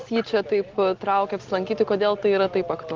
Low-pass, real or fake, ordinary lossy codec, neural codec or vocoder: 7.2 kHz; real; Opus, 16 kbps; none